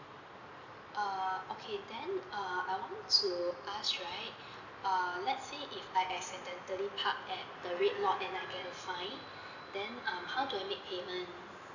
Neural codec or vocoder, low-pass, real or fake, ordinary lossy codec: none; 7.2 kHz; real; none